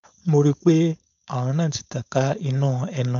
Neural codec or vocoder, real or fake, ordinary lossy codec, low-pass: codec, 16 kHz, 4.8 kbps, FACodec; fake; none; 7.2 kHz